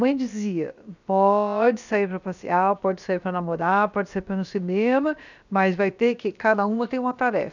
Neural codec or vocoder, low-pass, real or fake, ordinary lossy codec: codec, 16 kHz, about 1 kbps, DyCAST, with the encoder's durations; 7.2 kHz; fake; none